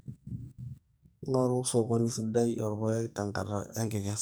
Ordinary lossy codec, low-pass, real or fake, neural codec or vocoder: none; none; fake; codec, 44.1 kHz, 2.6 kbps, SNAC